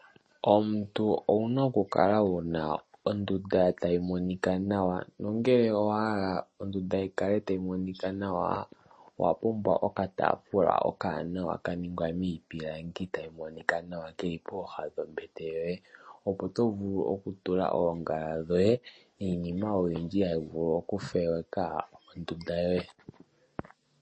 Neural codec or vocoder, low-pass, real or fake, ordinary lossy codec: none; 9.9 kHz; real; MP3, 32 kbps